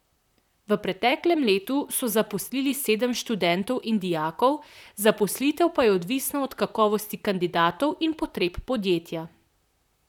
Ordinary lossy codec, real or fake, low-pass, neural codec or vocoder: none; fake; 19.8 kHz; vocoder, 44.1 kHz, 128 mel bands, Pupu-Vocoder